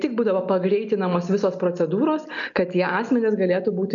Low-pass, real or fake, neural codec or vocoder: 7.2 kHz; real; none